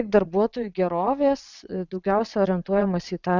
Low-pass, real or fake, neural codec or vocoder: 7.2 kHz; fake; vocoder, 22.05 kHz, 80 mel bands, WaveNeXt